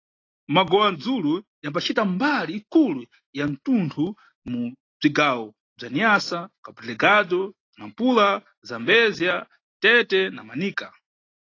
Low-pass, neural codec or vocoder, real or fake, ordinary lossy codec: 7.2 kHz; none; real; AAC, 32 kbps